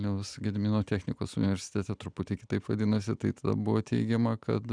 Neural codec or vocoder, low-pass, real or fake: none; 9.9 kHz; real